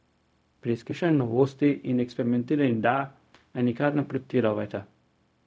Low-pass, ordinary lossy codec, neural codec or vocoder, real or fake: none; none; codec, 16 kHz, 0.4 kbps, LongCat-Audio-Codec; fake